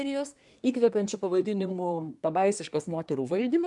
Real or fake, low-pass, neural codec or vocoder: fake; 10.8 kHz; codec, 24 kHz, 1 kbps, SNAC